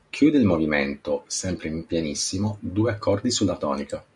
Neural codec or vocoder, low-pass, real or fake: none; 10.8 kHz; real